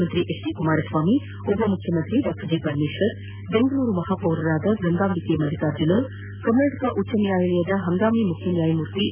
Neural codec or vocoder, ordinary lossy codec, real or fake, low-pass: none; none; real; 3.6 kHz